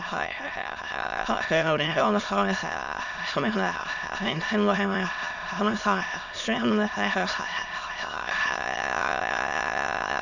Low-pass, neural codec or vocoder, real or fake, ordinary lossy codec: 7.2 kHz; autoencoder, 22.05 kHz, a latent of 192 numbers a frame, VITS, trained on many speakers; fake; none